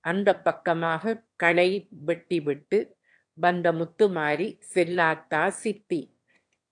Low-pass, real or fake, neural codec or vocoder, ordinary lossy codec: 9.9 kHz; fake; autoencoder, 22.05 kHz, a latent of 192 numbers a frame, VITS, trained on one speaker; AAC, 64 kbps